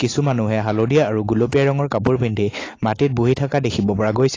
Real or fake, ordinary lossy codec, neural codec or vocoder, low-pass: real; AAC, 32 kbps; none; 7.2 kHz